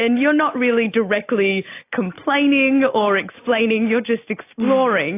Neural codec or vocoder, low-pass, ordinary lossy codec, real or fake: none; 3.6 kHz; AAC, 24 kbps; real